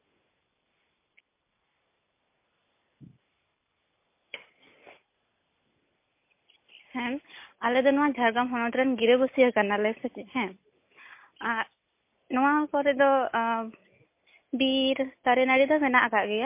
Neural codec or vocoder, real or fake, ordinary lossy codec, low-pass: none; real; MP3, 24 kbps; 3.6 kHz